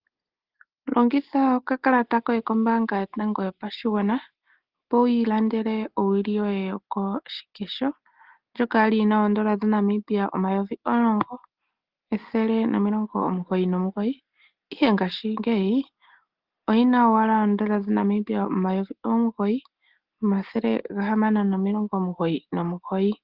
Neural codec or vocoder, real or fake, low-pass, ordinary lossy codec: none; real; 5.4 kHz; Opus, 16 kbps